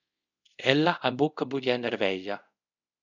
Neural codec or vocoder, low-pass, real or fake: codec, 24 kHz, 0.5 kbps, DualCodec; 7.2 kHz; fake